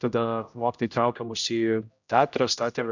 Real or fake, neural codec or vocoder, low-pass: fake; codec, 16 kHz, 0.5 kbps, X-Codec, HuBERT features, trained on general audio; 7.2 kHz